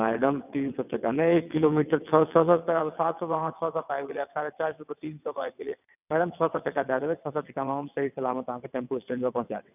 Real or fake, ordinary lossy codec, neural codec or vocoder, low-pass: fake; none; vocoder, 22.05 kHz, 80 mel bands, WaveNeXt; 3.6 kHz